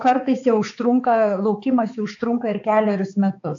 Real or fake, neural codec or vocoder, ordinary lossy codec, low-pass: fake; codec, 16 kHz, 4 kbps, X-Codec, HuBERT features, trained on balanced general audio; AAC, 48 kbps; 7.2 kHz